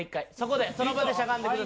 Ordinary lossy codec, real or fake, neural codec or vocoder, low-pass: none; real; none; none